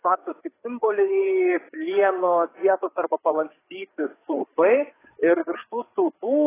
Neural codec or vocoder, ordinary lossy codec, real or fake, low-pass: codec, 16 kHz, 4 kbps, FreqCodec, larger model; AAC, 16 kbps; fake; 3.6 kHz